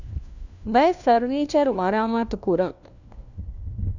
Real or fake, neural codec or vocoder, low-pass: fake; codec, 16 kHz, 1 kbps, FunCodec, trained on LibriTTS, 50 frames a second; 7.2 kHz